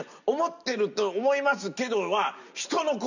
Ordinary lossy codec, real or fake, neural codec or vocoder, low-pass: none; real; none; 7.2 kHz